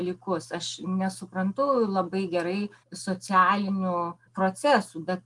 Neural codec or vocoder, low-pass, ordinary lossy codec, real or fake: none; 10.8 kHz; Opus, 32 kbps; real